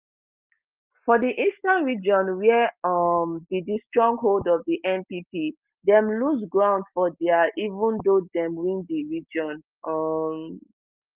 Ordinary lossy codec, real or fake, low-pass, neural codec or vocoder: Opus, 32 kbps; real; 3.6 kHz; none